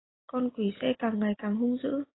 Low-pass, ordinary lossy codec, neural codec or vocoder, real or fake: 7.2 kHz; AAC, 16 kbps; none; real